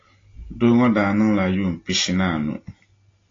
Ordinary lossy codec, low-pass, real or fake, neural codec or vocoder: AAC, 32 kbps; 7.2 kHz; real; none